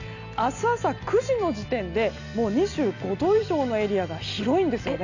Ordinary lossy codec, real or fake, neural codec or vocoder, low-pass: none; real; none; 7.2 kHz